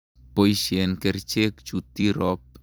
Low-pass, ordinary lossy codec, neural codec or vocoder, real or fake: none; none; vocoder, 44.1 kHz, 128 mel bands every 256 samples, BigVGAN v2; fake